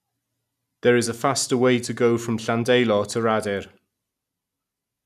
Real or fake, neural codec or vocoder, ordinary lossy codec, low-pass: real; none; none; 14.4 kHz